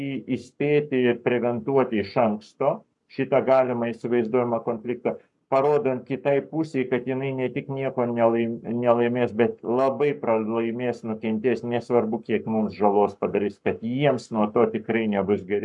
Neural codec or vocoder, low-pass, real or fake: codec, 44.1 kHz, 7.8 kbps, Pupu-Codec; 10.8 kHz; fake